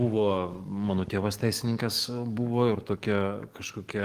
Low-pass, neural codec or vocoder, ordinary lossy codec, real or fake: 14.4 kHz; codec, 44.1 kHz, 7.8 kbps, DAC; Opus, 24 kbps; fake